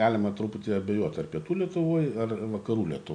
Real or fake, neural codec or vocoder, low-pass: real; none; 9.9 kHz